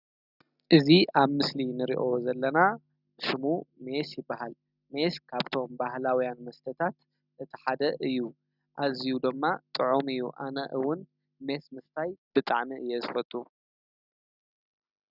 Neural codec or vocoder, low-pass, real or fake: none; 5.4 kHz; real